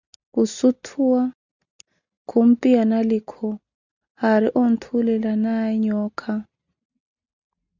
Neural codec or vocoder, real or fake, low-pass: none; real; 7.2 kHz